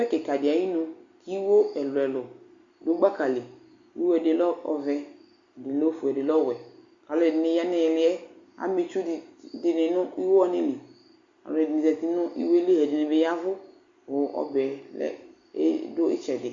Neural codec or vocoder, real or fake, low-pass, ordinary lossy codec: none; real; 7.2 kHz; Opus, 64 kbps